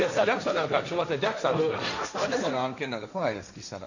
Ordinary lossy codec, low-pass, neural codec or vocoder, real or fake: none; 7.2 kHz; codec, 16 kHz, 1.1 kbps, Voila-Tokenizer; fake